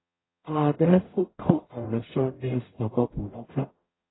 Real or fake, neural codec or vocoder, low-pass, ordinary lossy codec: fake; codec, 44.1 kHz, 0.9 kbps, DAC; 7.2 kHz; AAC, 16 kbps